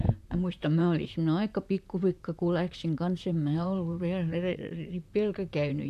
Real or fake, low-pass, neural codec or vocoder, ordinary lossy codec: fake; 14.4 kHz; vocoder, 44.1 kHz, 128 mel bands, Pupu-Vocoder; none